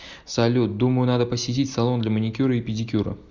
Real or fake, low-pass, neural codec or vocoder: real; 7.2 kHz; none